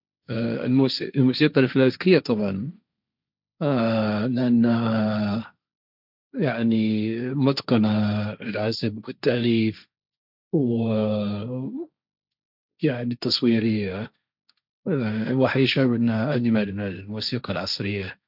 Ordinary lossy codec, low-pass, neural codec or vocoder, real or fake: none; 5.4 kHz; codec, 16 kHz, 1.1 kbps, Voila-Tokenizer; fake